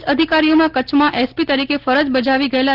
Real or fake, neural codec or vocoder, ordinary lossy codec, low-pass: real; none; Opus, 16 kbps; 5.4 kHz